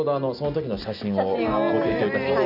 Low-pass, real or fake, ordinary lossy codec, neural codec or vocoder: 5.4 kHz; real; none; none